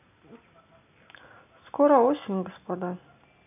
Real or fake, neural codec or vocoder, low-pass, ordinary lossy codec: real; none; 3.6 kHz; none